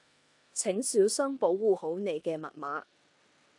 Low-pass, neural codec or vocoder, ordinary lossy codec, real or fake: 10.8 kHz; codec, 16 kHz in and 24 kHz out, 0.9 kbps, LongCat-Audio-Codec, four codebook decoder; AAC, 64 kbps; fake